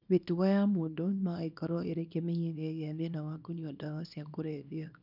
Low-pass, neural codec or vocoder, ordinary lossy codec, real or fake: 5.4 kHz; codec, 24 kHz, 0.9 kbps, WavTokenizer, small release; none; fake